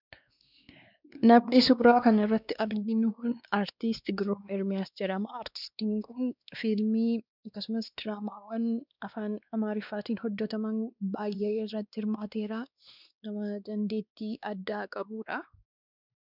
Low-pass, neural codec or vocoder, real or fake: 5.4 kHz; codec, 16 kHz, 2 kbps, X-Codec, WavLM features, trained on Multilingual LibriSpeech; fake